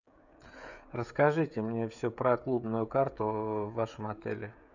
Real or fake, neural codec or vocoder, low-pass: fake; codec, 16 kHz, 16 kbps, FreqCodec, smaller model; 7.2 kHz